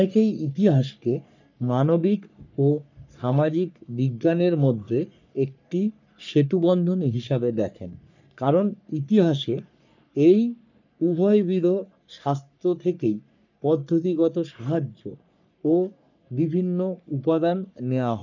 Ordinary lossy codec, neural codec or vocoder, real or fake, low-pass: none; codec, 44.1 kHz, 3.4 kbps, Pupu-Codec; fake; 7.2 kHz